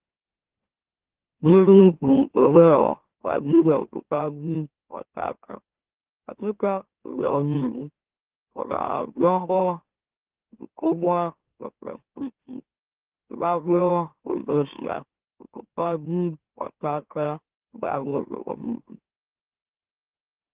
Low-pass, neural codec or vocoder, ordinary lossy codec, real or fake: 3.6 kHz; autoencoder, 44.1 kHz, a latent of 192 numbers a frame, MeloTTS; Opus, 16 kbps; fake